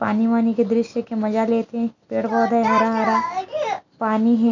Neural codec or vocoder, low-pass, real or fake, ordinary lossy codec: none; 7.2 kHz; real; none